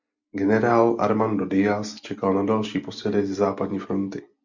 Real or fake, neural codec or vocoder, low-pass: real; none; 7.2 kHz